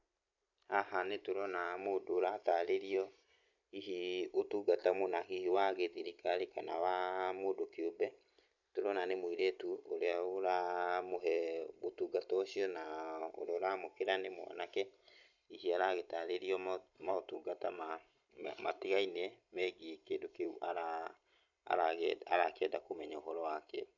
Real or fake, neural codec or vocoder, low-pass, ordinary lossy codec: fake; vocoder, 44.1 kHz, 128 mel bands every 256 samples, BigVGAN v2; 7.2 kHz; none